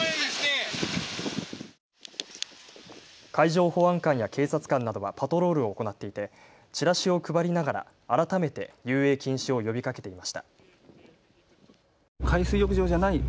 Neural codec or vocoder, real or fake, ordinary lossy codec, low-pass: none; real; none; none